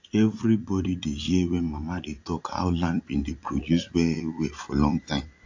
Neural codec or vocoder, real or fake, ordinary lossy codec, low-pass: vocoder, 44.1 kHz, 80 mel bands, Vocos; fake; AAC, 48 kbps; 7.2 kHz